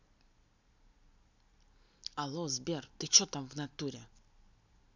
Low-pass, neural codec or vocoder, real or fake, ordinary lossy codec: 7.2 kHz; none; real; none